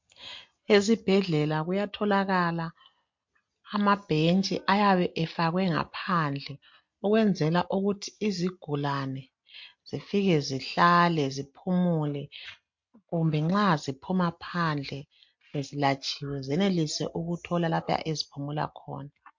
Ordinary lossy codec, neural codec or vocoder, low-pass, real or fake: MP3, 48 kbps; none; 7.2 kHz; real